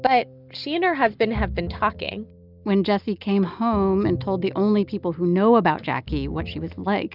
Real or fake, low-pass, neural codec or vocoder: real; 5.4 kHz; none